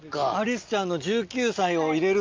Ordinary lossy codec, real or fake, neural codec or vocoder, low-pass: Opus, 24 kbps; real; none; 7.2 kHz